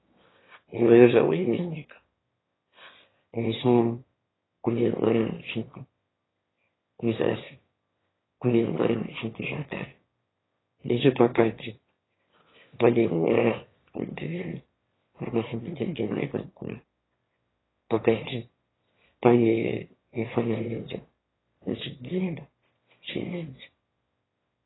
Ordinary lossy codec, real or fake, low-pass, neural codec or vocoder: AAC, 16 kbps; fake; 7.2 kHz; autoencoder, 22.05 kHz, a latent of 192 numbers a frame, VITS, trained on one speaker